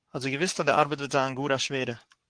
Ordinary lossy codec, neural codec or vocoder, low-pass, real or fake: Opus, 24 kbps; codec, 44.1 kHz, 7.8 kbps, Pupu-Codec; 9.9 kHz; fake